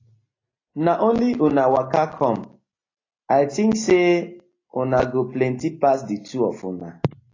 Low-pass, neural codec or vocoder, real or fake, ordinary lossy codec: 7.2 kHz; none; real; AAC, 32 kbps